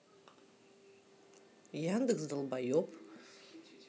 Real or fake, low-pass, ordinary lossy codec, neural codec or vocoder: real; none; none; none